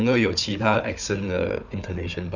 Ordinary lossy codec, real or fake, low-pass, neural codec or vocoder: none; fake; 7.2 kHz; codec, 16 kHz, 4 kbps, FunCodec, trained on Chinese and English, 50 frames a second